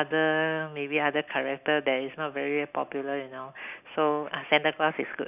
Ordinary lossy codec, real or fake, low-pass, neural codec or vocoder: none; real; 3.6 kHz; none